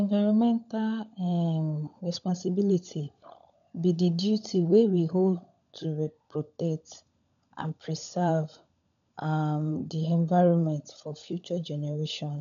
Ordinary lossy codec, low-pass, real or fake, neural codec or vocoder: MP3, 96 kbps; 7.2 kHz; fake; codec, 16 kHz, 16 kbps, FunCodec, trained on LibriTTS, 50 frames a second